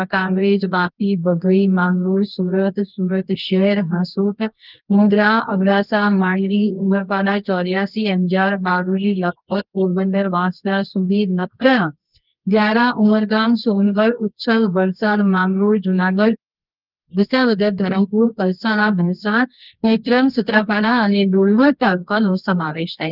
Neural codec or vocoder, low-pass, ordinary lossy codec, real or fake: codec, 24 kHz, 0.9 kbps, WavTokenizer, medium music audio release; 5.4 kHz; Opus, 24 kbps; fake